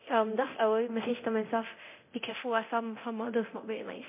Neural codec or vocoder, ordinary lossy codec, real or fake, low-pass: codec, 24 kHz, 0.9 kbps, DualCodec; MP3, 24 kbps; fake; 3.6 kHz